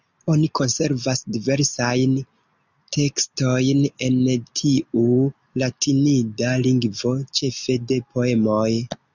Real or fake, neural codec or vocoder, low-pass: real; none; 7.2 kHz